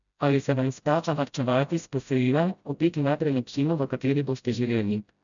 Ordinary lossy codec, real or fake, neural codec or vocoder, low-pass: none; fake; codec, 16 kHz, 0.5 kbps, FreqCodec, smaller model; 7.2 kHz